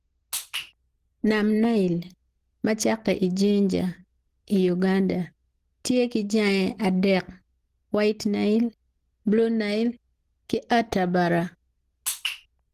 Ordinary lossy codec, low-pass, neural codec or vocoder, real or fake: Opus, 16 kbps; 14.4 kHz; none; real